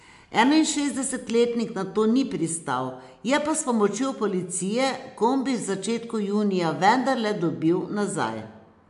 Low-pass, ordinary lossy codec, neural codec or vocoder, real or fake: 10.8 kHz; AAC, 96 kbps; none; real